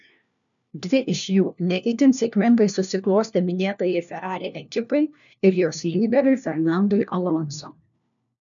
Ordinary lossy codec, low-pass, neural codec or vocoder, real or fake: MP3, 96 kbps; 7.2 kHz; codec, 16 kHz, 1 kbps, FunCodec, trained on LibriTTS, 50 frames a second; fake